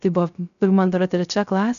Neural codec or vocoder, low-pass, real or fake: codec, 16 kHz, 0.3 kbps, FocalCodec; 7.2 kHz; fake